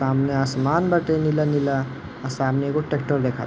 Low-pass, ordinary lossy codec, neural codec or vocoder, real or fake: none; none; none; real